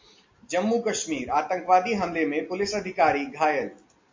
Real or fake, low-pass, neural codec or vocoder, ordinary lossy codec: real; 7.2 kHz; none; MP3, 48 kbps